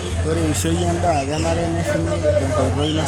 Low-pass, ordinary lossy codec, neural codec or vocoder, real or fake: none; none; codec, 44.1 kHz, 7.8 kbps, Pupu-Codec; fake